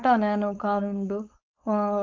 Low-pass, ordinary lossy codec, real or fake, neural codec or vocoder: 7.2 kHz; Opus, 24 kbps; fake; codec, 16 kHz, 4.8 kbps, FACodec